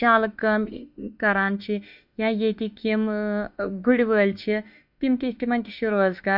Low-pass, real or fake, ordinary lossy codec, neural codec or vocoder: 5.4 kHz; fake; none; autoencoder, 48 kHz, 32 numbers a frame, DAC-VAE, trained on Japanese speech